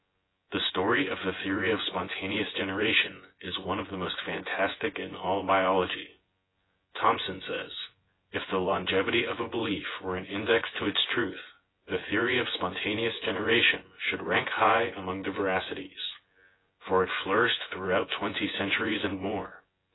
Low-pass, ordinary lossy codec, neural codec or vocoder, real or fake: 7.2 kHz; AAC, 16 kbps; vocoder, 24 kHz, 100 mel bands, Vocos; fake